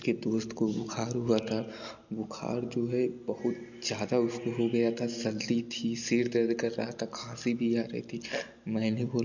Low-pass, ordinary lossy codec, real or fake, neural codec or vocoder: 7.2 kHz; none; real; none